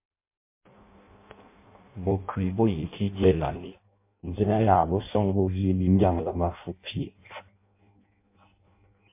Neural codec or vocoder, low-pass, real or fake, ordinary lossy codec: codec, 16 kHz in and 24 kHz out, 0.6 kbps, FireRedTTS-2 codec; 3.6 kHz; fake; MP3, 24 kbps